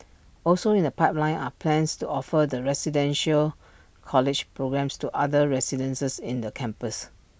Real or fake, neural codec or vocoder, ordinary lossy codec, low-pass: real; none; none; none